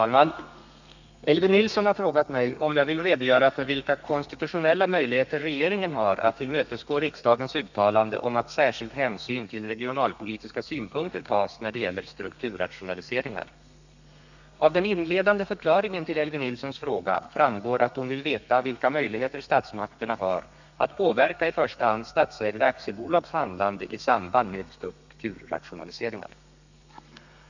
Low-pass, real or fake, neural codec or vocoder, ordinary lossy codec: 7.2 kHz; fake; codec, 32 kHz, 1.9 kbps, SNAC; none